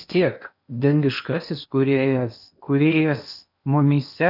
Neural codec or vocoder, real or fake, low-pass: codec, 16 kHz in and 24 kHz out, 0.8 kbps, FocalCodec, streaming, 65536 codes; fake; 5.4 kHz